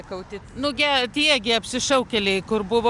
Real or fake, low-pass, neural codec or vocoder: real; 10.8 kHz; none